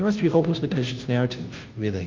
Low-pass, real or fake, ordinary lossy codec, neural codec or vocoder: 7.2 kHz; fake; Opus, 24 kbps; codec, 16 kHz, 0.5 kbps, FunCodec, trained on Chinese and English, 25 frames a second